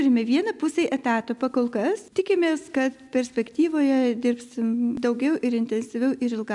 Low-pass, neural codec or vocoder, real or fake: 10.8 kHz; none; real